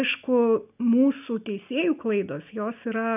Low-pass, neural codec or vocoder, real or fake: 3.6 kHz; none; real